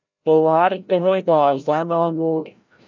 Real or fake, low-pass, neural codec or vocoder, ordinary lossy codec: fake; 7.2 kHz; codec, 16 kHz, 0.5 kbps, FreqCodec, larger model; MP3, 64 kbps